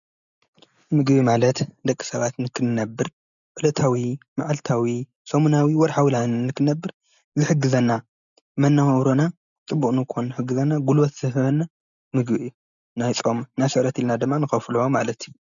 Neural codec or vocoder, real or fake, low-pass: none; real; 7.2 kHz